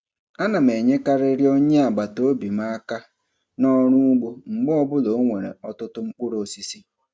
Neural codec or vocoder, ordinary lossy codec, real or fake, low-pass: none; none; real; none